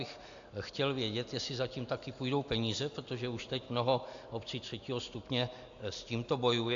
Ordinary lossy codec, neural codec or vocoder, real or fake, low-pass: AAC, 64 kbps; none; real; 7.2 kHz